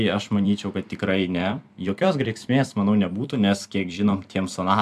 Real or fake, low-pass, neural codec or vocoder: fake; 14.4 kHz; vocoder, 44.1 kHz, 128 mel bands every 256 samples, BigVGAN v2